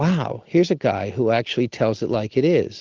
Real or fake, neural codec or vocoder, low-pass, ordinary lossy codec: real; none; 7.2 kHz; Opus, 32 kbps